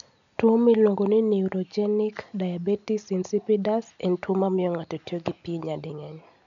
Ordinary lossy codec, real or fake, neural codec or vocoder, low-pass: none; real; none; 7.2 kHz